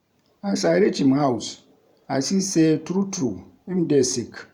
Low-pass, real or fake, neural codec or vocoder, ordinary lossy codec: 19.8 kHz; fake; vocoder, 44.1 kHz, 128 mel bands every 512 samples, BigVGAN v2; none